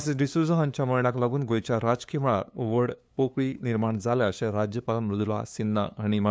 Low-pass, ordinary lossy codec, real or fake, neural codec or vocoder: none; none; fake; codec, 16 kHz, 2 kbps, FunCodec, trained on LibriTTS, 25 frames a second